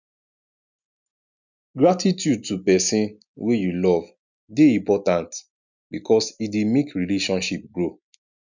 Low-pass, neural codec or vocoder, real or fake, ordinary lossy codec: 7.2 kHz; none; real; none